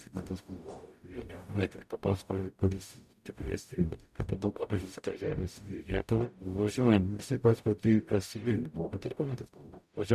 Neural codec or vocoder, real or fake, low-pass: codec, 44.1 kHz, 0.9 kbps, DAC; fake; 14.4 kHz